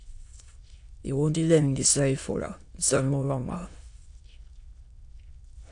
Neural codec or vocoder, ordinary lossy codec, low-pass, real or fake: autoencoder, 22.05 kHz, a latent of 192 numbers a frame, VITS, trained on many speakers; AAC, 64 kbps; 9.9 kHz; fake